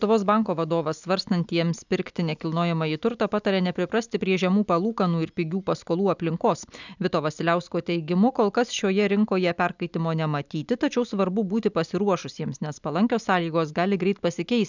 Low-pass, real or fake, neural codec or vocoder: 7.2 kHz; real; none